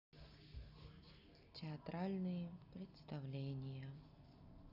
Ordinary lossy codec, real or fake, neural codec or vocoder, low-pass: none; real; none; 5.4 kHz